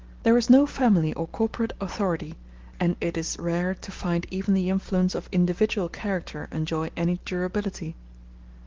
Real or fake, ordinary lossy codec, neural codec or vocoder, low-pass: real; Opus, 16 kbps; none; 7.2 kHz